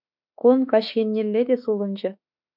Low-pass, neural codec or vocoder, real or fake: 5.4 kHz; autoencoder, 48 kHz, 32 numbers a frame, DAC-VAE, trained on Japanese speech; fake